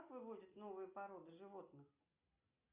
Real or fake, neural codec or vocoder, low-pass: real; none; 3.6 kHz